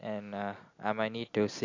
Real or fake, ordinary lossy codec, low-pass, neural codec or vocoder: real; AAC, 48 kbps; 7.2 kHz; none